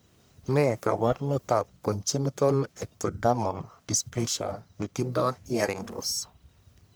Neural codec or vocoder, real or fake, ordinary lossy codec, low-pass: codec, 44.1 kHz, 1.7 kbps, Pupu-Codec; fake; none; none